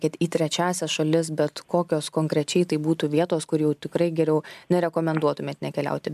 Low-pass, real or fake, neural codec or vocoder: 14.4 kHz; real; none